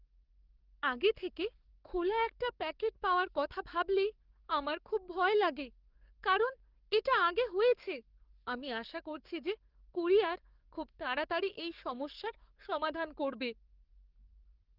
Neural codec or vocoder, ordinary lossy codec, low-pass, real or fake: codec, 44.1 kHz, 7.8 kbps, DAC; Opus, 32 kbps; 5.4 kHz; fake